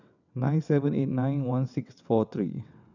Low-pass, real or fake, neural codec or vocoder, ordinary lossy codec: 7.2 kHz; fake; vocoder, 22.05 kHz, 80 mel bands, WaveNeXt; none